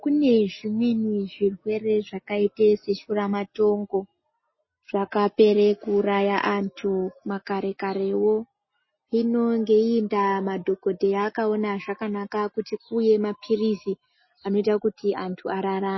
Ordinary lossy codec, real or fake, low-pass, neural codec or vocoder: MP3, 24 kbps; real; 7.2 kHz; none